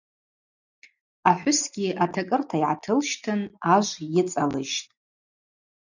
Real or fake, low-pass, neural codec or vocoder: real; 7.2 kHz; none